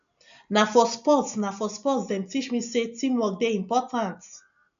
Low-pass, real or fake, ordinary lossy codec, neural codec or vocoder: 7.2 kHz; real; AAC, 96 kbps; none